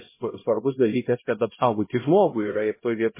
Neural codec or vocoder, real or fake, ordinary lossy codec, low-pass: codec, 16 kHz, 0.5 kbps, X-Codec, HuBERT features, trained on LibriSpeech; fake; MP3, 16 kbps; 3.6 kHz